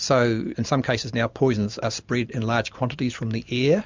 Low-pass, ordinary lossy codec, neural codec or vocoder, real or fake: 7.2 kHz; MP3, 64 kbps; none; real